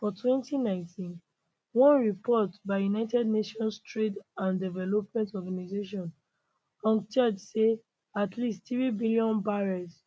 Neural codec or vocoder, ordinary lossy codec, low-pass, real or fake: none; none; none; real